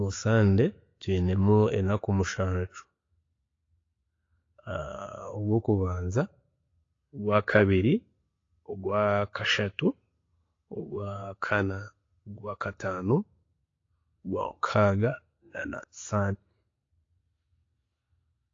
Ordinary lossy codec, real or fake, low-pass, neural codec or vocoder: AAC, 32 kbps; real; 7.2 kHz; none